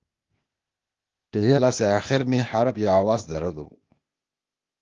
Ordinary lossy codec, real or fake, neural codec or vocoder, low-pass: Opus, 32 kbps; fake; codec, 16 kHz, 0.8 kbps, ZipCodec; 7.2 kHz